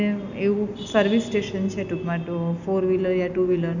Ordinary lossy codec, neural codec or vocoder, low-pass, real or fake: none; none; 7.2 kHz; real